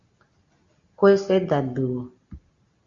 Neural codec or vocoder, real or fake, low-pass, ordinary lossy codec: none; real; 7.2 kHz; Opus, 64 kbps